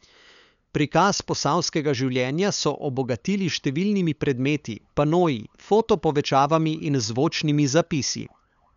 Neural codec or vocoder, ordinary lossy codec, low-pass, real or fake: codec, 16 kHz, 8 kbps, FunCodec, trained on LibriTTS, 25 frames a second; none; 7.2 kHz; fake